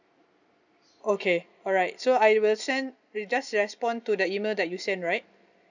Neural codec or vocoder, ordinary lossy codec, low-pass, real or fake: none; none; 7.2 kHz; real